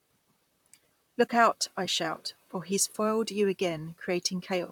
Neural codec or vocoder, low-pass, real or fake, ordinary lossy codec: vocoder, 44.1 kHz, 128 mel bands, Pupu-Vocoder; 19.8 kHz; fake; none